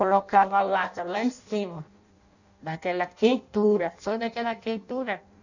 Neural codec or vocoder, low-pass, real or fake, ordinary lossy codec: codec, 16 kHz in and 24 kHz out, 0.6 kbps, FireRedTTS-2 codec; 7.2 kHz; fake; none